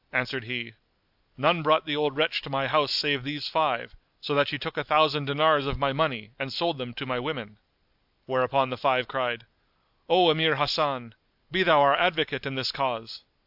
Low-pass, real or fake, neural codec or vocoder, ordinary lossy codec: 5.4 kHz; real; none; AAC, 48 kbps